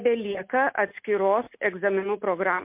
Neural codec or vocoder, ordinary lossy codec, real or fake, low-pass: none; MP3, 32 kbps; real; 3.6 kHz